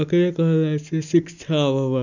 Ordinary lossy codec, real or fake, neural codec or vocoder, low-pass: none; real; none; 7.2 kHz